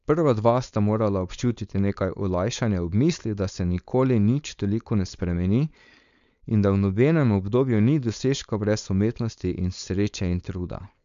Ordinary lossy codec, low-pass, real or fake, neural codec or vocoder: MP3, 64 kbps; 7.2 kHz; fake; codec, 16 kHz, 4.8 kbps, FACodec